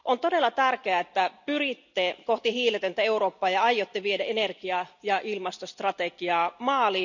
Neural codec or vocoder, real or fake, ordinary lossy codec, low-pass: none; real; none; 7.2 kHz